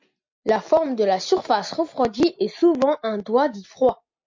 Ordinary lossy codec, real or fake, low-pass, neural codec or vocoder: MP3, 48 kbps; real; 7.2 kHz; none